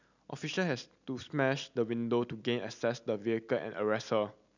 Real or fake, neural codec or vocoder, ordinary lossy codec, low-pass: real; none; none; 7.2 kHz